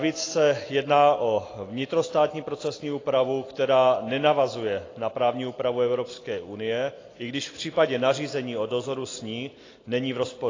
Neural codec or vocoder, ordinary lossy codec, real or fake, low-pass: none; AAC, 32 kbps; real; 7.2 kHz